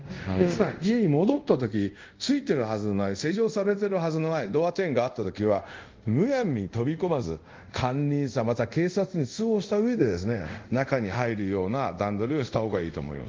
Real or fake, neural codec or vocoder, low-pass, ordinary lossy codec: fake; codec, 24 kHz, 0.5 kbps, DualCodec; 7.2 kHz; Opus, 32 kbps